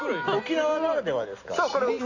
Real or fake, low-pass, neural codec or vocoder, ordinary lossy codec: real; 7.2 kHz; none; none